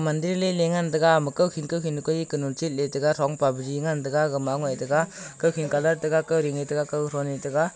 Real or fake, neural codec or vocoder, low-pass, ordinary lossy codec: real; none; none; none